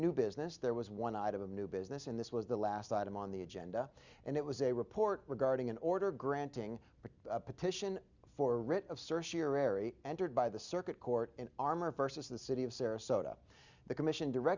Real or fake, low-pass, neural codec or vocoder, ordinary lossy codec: real; 7.2 kHz; none; Opus, 64 kbps